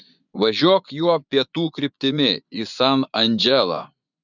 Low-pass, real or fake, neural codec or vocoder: 7.2 kHz; fake; vocoder, 24 kHz, 100 mel bands, Vocos